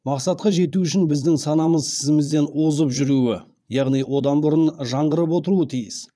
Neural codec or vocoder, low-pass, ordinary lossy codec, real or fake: vocoder, 22.05 kHz, 80 mel bands, Vocos; none; none; fake